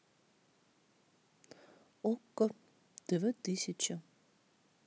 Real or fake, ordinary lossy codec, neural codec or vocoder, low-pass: real; none; none; none